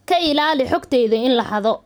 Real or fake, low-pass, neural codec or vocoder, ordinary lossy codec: fake; none; vocoder, 44.1 kHz, 128 mel bands every 512 samples, BigVGAN v2; none